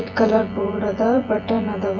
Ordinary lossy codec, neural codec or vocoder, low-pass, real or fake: none; vocoder, 24 kHz, 100 mel bands, Vocos; 7.2 kHz; fake